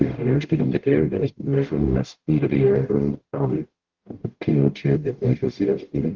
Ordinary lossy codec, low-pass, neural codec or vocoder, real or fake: Opus, 16 kbps; 7.2 kHz; codec, 44.1 kHz, 0.9 kbps, DAC; fake